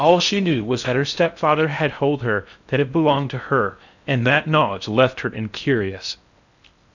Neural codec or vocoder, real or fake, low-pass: codec, 16 kHz in and 24 kHz out, 0.6 kbps, FocalCodec, streaming, 4096 codes; fake; 7.2 kHz